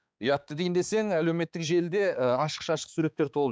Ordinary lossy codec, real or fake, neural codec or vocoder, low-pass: none; fake; codec, 16 kHz, 4 kbps, X-Codec, HuBERT features, trained on balanced general audio; none